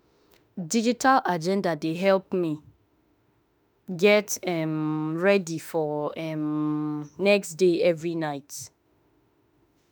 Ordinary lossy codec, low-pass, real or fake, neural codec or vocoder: none; none; fake; autoencoder, 48 kHz, 32 numbers a frame, DAC-VAE, trained on Japanese speech